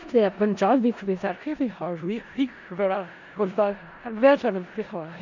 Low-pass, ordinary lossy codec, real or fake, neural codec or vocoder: 7.2 kHz; none; fake; codec, 16 kHz in and 24 kHz out, 0.4 kbps, LongCat-Audio-Codec, four codebook decoder